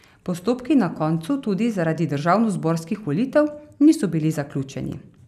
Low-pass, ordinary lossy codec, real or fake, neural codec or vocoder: 14.4 kHz; none; real; none